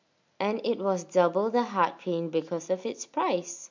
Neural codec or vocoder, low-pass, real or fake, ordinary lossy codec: none; 7.2 kHz; real; MP3, 48 kbps